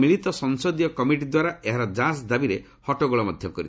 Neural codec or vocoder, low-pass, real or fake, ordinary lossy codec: none; none; real; none